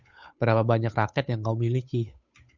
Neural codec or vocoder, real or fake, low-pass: codec, 16 kHz, 8 kbps, FunCodec, trained on Chinese and English, 25 frames a second; fake; 7.2 kHz